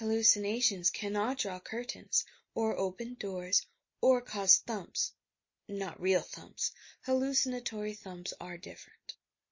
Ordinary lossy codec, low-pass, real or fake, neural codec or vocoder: MP3, 32 kbps; 7.2 kHz; real; none